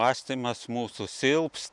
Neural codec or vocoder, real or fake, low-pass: none; real; 10.8 kHz